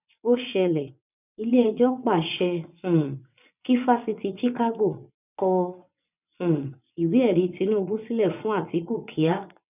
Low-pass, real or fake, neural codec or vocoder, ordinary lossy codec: 3.6 kHz; fake; vocoder, 22.05 kHz, 80 mel bands, WaveNeXt; none